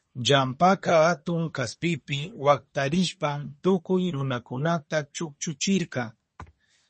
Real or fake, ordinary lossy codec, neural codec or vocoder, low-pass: fake; MP3, 32 kbps; codec, 24 kHz, 1 kbps, SNAC; 10.8 kHz